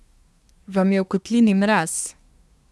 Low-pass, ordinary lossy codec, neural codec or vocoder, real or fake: none; none; codec, 24 kHz, 1 kbps, SNAC; fake